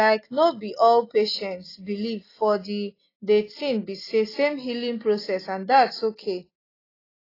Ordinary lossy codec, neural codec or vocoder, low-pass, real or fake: AAC, 24 kbps; none; 5.4 kHz; real